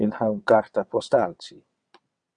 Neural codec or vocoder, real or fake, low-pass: vocoder, 22.05 kHz, 80 mel bands, WaveNeXt; fake; 9.9 kHz